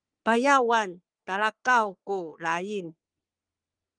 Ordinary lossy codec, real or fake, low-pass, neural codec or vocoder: Opus, 32 kbps; fake; 9.9 kHz; codec, 44.1 kHz, 3.4 kbps, Pupu-Codec